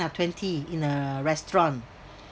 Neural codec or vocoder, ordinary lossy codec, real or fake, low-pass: none; none; real; none